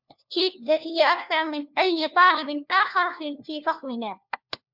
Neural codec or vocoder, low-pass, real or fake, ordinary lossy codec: codec, 16 kHz, 1 kbps, FunCodec, trained on LibriTTS, 50 frames a second; 5.4 kHz; fake; MP3, 48 kbps